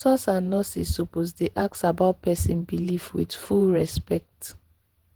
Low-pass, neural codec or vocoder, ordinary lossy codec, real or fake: 19.8 kHz; vocoder, 48 kHz, 128 mel bands, Vocos; Opus, 16 kbps; fake